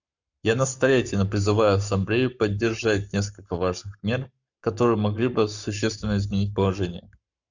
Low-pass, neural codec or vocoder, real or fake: 7.2 kHz; codec, 44.1 kHz, 7.8 kbps, Pupu-Codec; fake